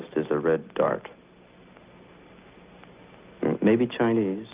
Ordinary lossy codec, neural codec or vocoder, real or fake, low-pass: Opus, 24 kbps; none; real; 3.6 kHz